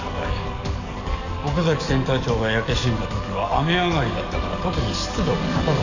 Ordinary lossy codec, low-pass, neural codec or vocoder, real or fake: none; 7.2 kHz; codec, 44.1 kHz, 7.8 kbps, DAC; fake